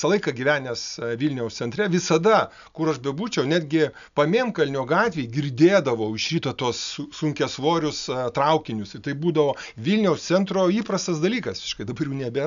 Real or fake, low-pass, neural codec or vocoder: real; 7.2 kHz; none